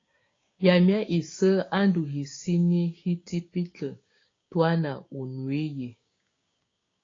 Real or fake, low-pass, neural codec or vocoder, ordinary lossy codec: fake; 7.2 kHz; codec, 16 kHz, 6 kbps, DAC; AAC, 32 kbps